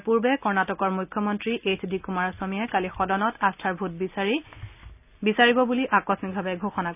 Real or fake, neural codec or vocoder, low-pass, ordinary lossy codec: real; none; 3.6 kHz; none